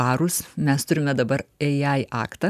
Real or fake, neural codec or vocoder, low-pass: real; none; 14.4 kHz